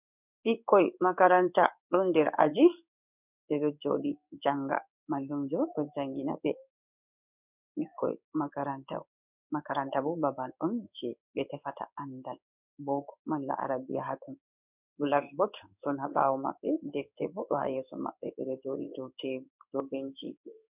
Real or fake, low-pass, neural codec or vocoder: fake; 3.6 kHz; codec, 16 kHz in and 24 kHz out, 1 kbps, XY-Tokenizer